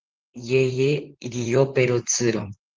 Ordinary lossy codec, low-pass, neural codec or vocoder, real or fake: Opus, 16 kbps; 7.2 kHz; vocoder, 44.1 kHz, 128 mel bands, Pupu-Vocoder; fake